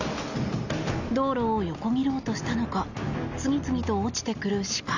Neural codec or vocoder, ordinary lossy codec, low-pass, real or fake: none; none; 7.2 kHz; real